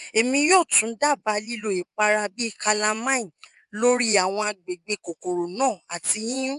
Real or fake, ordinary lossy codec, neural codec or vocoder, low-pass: fake; none; vocoder, 24 kHz, 100 mel bands, Vocos; 10.8 kHz